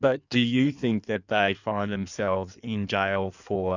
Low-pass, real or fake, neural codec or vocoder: 7.2 kHz; fake; codec, 16 kHz in and 24 kHz out, 1.1 kbps, FireRedTTS-2 codec